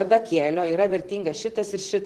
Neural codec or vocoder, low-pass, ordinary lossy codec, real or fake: vocoder, 44.1 kHz, 128 mel bands, Pupu-Vocoder; 14.4 kHz; Opus, 16 kbps; fake